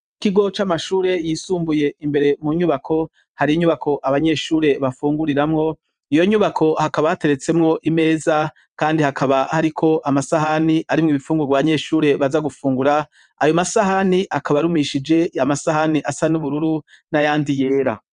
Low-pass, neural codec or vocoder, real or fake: 9.9 kHz; vocoder, 22.05 kHz, 80 mel bands, WaveNeXt; fake